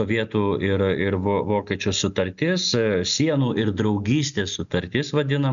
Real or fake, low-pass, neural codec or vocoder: real; 7.2 kHz; none